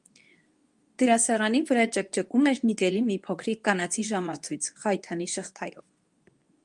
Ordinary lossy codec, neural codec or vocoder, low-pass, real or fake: Opus, 32 kbps; codec, 24 kHz, 0.9 kbps, WavTokenizer, medium speech release version 2; 10.8 kHz; fake